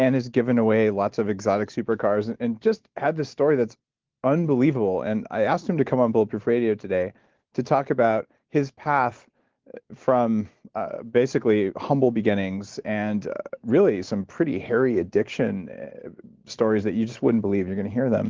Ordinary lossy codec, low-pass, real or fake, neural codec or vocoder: Opus, 16 kbps; 7.2 kHz; real; none